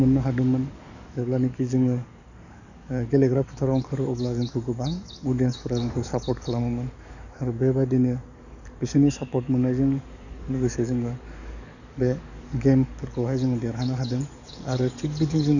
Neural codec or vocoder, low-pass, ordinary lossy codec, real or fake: codec, 44.1 kHz, 7.8 kbps, DAC; 7.2 kHz; none; fake